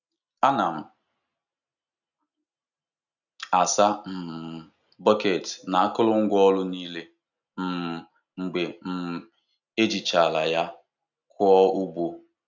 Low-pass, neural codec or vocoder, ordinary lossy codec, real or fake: 7.2 kHz; none; none; real